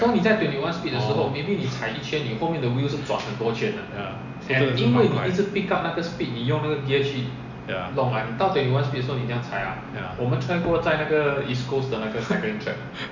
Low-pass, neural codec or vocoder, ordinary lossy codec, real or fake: 7.2 kHz; none; none; real